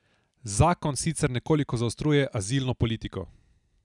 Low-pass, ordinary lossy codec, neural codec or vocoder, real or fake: 10.8 kHz; none; none; real